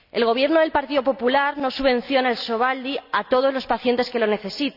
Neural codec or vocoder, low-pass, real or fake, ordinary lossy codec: none; 5.4 kHz; real; none